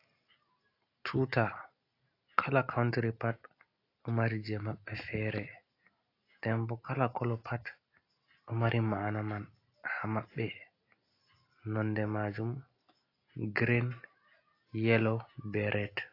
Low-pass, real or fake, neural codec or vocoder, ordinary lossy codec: 5.4 kHz; real; none; AAC, 32 kbps